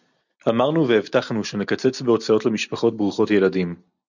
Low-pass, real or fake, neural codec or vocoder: 7.2 kHz; real; none